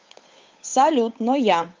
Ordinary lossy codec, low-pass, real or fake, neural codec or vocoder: Opus, 24 kbps; 7.2 kHz; fake; codec, 16 kHz, 8 kbps, FunCodec, trained on Chinese and English, 25 frames a second